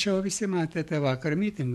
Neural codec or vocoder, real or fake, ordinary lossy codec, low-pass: codec, 44.1 kHz, 7.8 kbps, DAC; fake; MP3, 64 kbps; 19.8 kHz